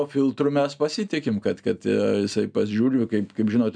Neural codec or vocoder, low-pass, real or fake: none; 9.9 kHz; real